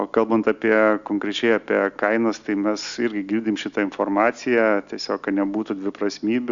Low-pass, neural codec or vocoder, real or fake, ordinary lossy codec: 7.2 kHz; none; real; Opus, 64 kbps